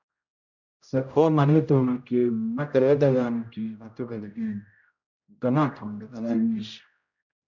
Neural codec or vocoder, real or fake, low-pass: codec, 16 kHz, 0.5 kbps, X-Codec, HuBERT features, trained on general audio; fake; 7.2 kHz